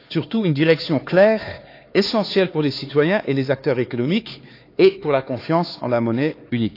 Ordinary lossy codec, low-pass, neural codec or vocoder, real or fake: AAC, 32 kbps; 5.4 kHz; codec, 16 kHz, 4 kbps, X-Codec, HuBERT features, trained on LibriSpeech; fake